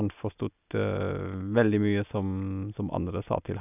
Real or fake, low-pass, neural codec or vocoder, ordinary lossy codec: real; 3.6 kHz; none; none